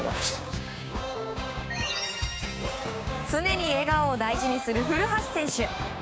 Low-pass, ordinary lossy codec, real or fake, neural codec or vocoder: none; none; fake; codec, 16 kHz, 6 kbps, DAC